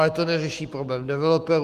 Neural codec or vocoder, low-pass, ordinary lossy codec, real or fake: autoencoder, 48 kHz, 128 numbers a frame, DAC-VAE, trained on Japanese speech; 14.4 kHz; Opus, 24 kbps; fake